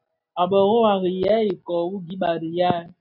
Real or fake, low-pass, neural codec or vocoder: real; 5.4 kHz; none